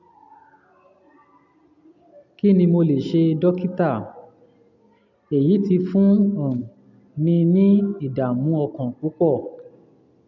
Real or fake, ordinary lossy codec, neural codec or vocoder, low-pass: real; none; none; 7.2 kHz